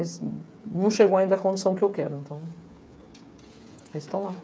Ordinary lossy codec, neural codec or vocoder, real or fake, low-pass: none; codec, 16 kHz, 8 kbps, FreqCodec, smaller model; fake; none